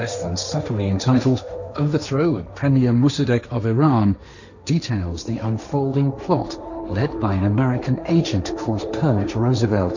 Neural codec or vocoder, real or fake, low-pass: codec, 16 kHz, 1.1 kbps, Voila-Tokenizer; fake; 7.2 kHz